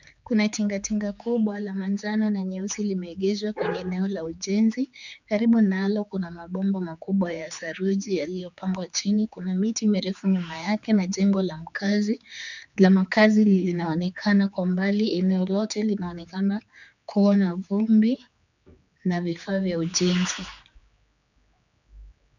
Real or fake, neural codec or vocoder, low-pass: fake; codec, 16 kHz, 4 kbps, X-Codec, HuBERT features, trained on general audio; 7.2 kHz